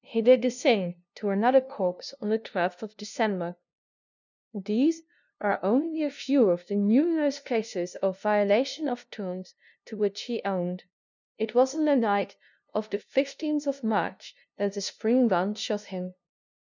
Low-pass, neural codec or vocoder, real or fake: 7.2 kHz; codec, 16 kHz, 0.5 kbps, FunCodec, trained on LibriTTS, 25 frames a second; fake